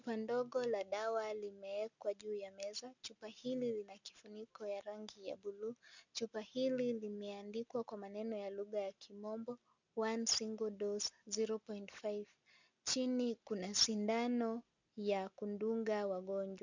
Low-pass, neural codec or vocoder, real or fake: 7.2 kHz; none; real